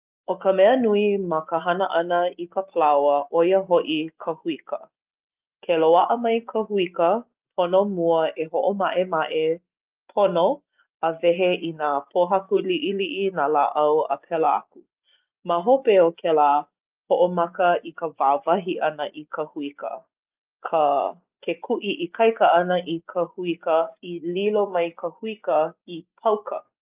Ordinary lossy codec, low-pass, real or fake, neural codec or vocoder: Opus, 24 kbps; 3.6 kHz; real; none